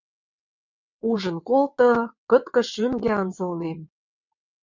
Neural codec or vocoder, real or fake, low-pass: vocoder, 22.05 kHz, 80 mel bands, WaveNeXt; fake; 7.2 kHz